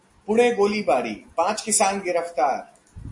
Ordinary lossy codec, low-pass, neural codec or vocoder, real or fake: MP3, 48 kbps; 10.8 kHz; none; real